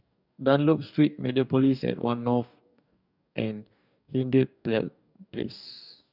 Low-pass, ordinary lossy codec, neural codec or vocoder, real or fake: 5.4 kHz; none; codec, 44.1 kHz, 2.6 kbps, DAC; fake